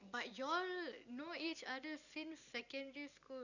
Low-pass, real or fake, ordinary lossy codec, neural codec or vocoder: 7.2 kHz; real; none; none